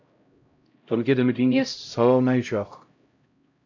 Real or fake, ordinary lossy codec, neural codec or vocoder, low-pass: fake; AAC, 48 kbps; codec, 16 kHz, 0.5 kbps, X-Codec, HuBERT features, trained on LibriSpeech; 7.2 kHz